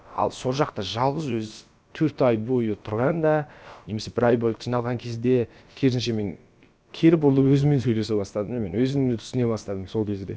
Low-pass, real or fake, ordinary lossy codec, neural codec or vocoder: none; fake; none; codec, 16 kHz, about 1 kbps, DyCAST, with the encoder's durations